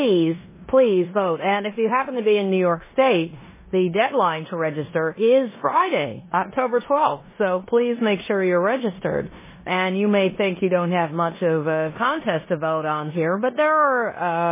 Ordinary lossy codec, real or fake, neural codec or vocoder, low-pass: MP3, 16 kbps; fake; codec, 16 kHz in and 24 kHz out, 0.9 kbps, LongCat-Audio-Codec, four codebook decoder; 3.6 kHz